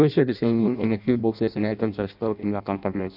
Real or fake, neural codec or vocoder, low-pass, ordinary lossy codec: fake; codec, 16 kHz in and 24 kHz out, 0.6 kbps, FireRedTTS-2 codec; 5.4 kHz; none